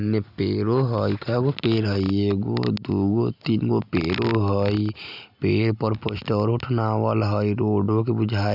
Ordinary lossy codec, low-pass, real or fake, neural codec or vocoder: none; 5.4 kHz; real; none